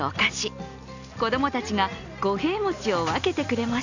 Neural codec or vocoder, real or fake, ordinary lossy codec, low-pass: none; real; none; 7.2 kHz